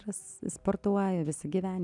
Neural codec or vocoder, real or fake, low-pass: none; real; 10.8 kHz